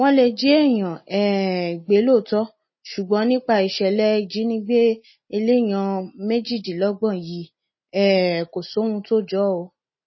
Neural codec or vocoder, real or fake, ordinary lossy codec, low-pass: none; real; MP3, 24 kbps; 7.2 kHz